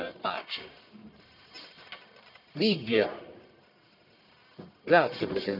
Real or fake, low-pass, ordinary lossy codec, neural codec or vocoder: fake; 5.4 kHz; none; codec, 44.1 kHz, 1.7 kbps, Pupu-Codec